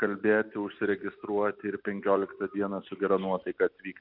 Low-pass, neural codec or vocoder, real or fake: 5.4 kHz; none; real